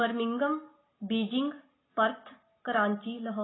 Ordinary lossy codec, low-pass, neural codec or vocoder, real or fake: AAC, 16 kbps; 7.2 kHz; none; real